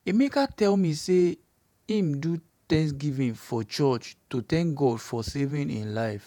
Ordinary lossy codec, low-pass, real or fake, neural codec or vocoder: none; 19.8 kHz; fake; vocoder, 48 kHz, 128 mel bands, Vocos